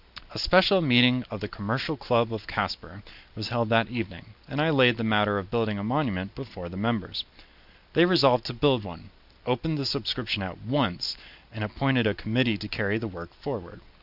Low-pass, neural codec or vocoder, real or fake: 5.4 kHz; none; real